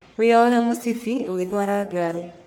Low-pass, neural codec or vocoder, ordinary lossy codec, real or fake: none; codec, 44.1 kHz, 1.7 kbps, Pupu-Codec; none; fake